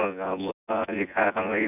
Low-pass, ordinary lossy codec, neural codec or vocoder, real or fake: 3.6 kHz; none; vocoder, 24 kHz, 100 mel bands, Vocos; fake